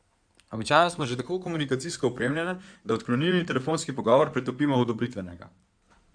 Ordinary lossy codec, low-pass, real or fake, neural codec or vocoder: none; 9.9 kHz; fake; codec, 16 kHz in and 24 kHz out, 2.2 kbps, FireRedTTS-2 codec